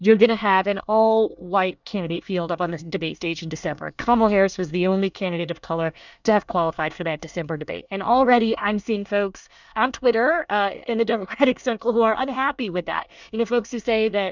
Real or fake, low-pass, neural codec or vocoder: fake; 7.2 kHz; codec, 24 kHz, 1 kbps, SNAC